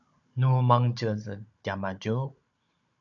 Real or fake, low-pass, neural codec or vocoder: fake; 7.2 kHz; codec, 16 kHz, 16 kbps, FunCodec, trained on Chinese and English, 50 frames a second